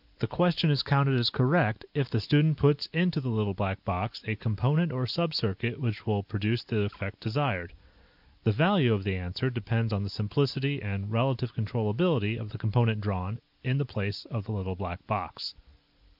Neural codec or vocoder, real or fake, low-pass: none; real; 5.4 kHz